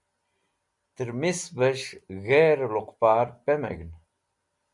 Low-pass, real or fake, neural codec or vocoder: 10.8 kHz; real; none